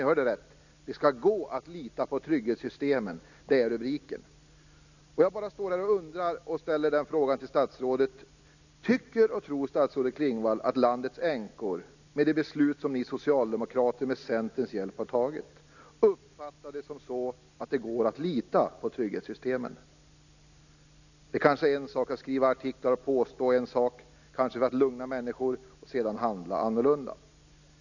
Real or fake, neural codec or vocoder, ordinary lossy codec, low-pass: real; none; none; 7.2 kHz